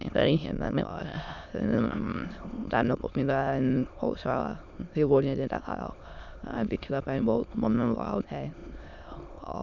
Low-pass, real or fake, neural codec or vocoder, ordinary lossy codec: 7.2 kHz; fake; autoencoder, 22.05 kHz, a latent of 192 numbers a frame, VITS, trained on many speakers; none